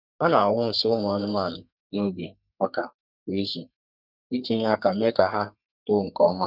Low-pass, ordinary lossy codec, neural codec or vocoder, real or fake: 5.4 kHz; none; codec, 32 kHz, 1.9 kbps, SNAC; fake